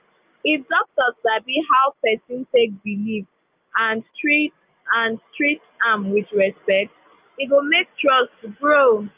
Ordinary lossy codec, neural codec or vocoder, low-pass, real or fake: Opus, 24 kbps; none; 3.6 kHz; real